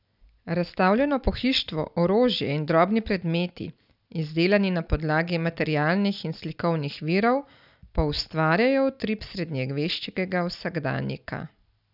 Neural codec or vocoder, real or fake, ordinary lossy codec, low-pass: none; real; none; 5.4 kHz